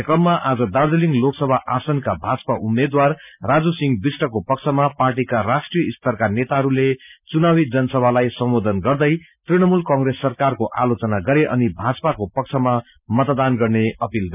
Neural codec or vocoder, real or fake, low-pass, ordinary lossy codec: none; real; 3.6 kHz; none